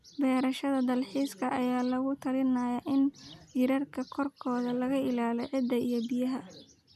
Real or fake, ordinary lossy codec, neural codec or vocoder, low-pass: real; none; none; 14.4 kHz